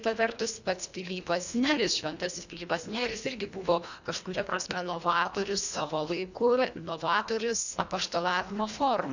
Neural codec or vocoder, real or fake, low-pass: codec, 24 kHz, 1.5 kbps, HILCodec; fake; 7.2 kHz